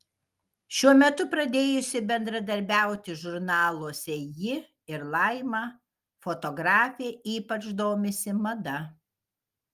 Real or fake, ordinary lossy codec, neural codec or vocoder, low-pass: real; Opus, 32 kbps; none; 14.4 kHz